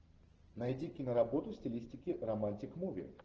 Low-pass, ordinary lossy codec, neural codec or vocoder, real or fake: 7.2 kHz; Opus, 16 kbps; none; real